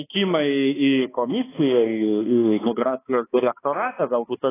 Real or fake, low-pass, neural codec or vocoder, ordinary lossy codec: fake; 3.6 kHz; codec, 16 kHz, 2 kbps, X-Codec, HuBERT features, trained on balanced general audio; AAC, 16 kbps